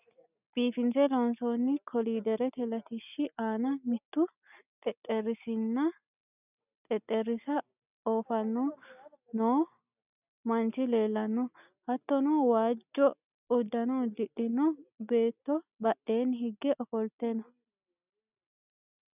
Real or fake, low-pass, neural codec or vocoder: real; 3.6 kHz; none